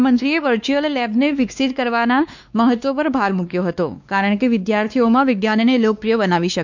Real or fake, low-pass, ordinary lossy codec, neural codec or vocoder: fake; 7.2 kHz; none; codec, 16 kHz, 2 kbps, X-Codec, WavLM features, trained on Multilingual LibriSpeech